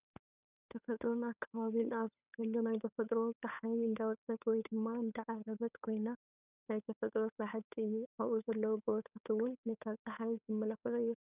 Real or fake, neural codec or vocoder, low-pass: fake; codec, 16 kHz, 8 kbps, FunCodec, trained on Chinese and English, 25 frames a second; 3.6 kHz